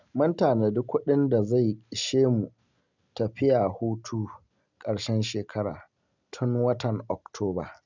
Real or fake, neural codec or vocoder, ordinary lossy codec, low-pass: real; none; none; 7.2 kHz